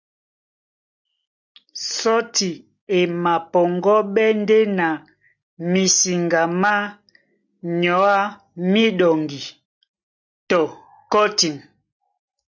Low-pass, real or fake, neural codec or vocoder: 7.2 kHz; real; none